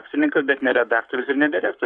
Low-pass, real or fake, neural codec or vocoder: 9.9 kHz; fake; codec, 44.1 kHz, 7.8 kbps, Pupu-Codec